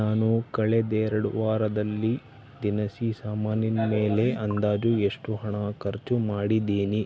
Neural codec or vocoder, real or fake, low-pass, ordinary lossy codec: none; real; none; none